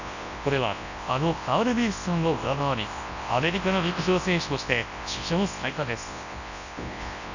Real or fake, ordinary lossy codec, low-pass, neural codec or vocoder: fake; none; 7.2 kHz; codec, 24 kHz, 0.9 kbps, WavTokenizer, large speech release